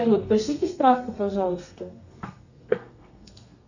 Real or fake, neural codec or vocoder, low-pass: fake; codec, 32 kHz, 1.9 kbps, SNAC; 7.2 kHz